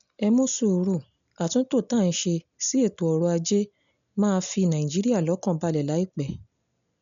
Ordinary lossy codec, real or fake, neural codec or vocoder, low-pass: none; real; none; 7.2 kHz